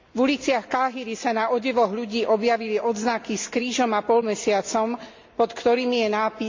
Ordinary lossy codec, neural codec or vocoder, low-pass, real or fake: MP3, 48 kbps; none; 7.2 kHz; real